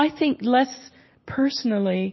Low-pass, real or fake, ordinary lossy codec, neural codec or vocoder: 7.2 kHz; real; MP3, 24 kbps; none